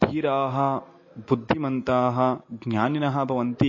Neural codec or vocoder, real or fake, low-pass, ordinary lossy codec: none; real; 7.2 kHz; MP3, 32 kbps